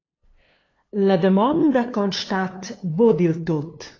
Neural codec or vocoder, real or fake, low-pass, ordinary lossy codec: codec, 16 kHz, 2 kbps, FunCodec, trained on LibriTTS, 25 frames a second; fake; 7.2 kHz; AAC, 32 kbps